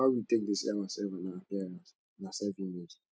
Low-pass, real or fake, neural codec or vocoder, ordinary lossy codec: none; real; none; none